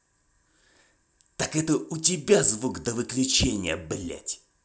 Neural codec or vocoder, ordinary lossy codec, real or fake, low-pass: none; none; real; none